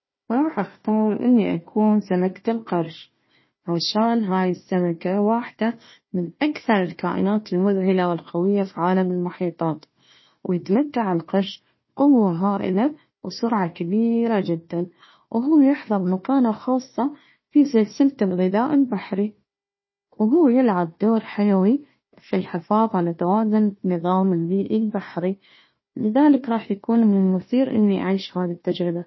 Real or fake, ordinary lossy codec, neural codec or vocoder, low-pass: fake; MP3, 24 kbps; codec, 16 kHz, 1 kbps, FunCodec, trained on Chinese and English, 50 frames a second; 7.2 kHz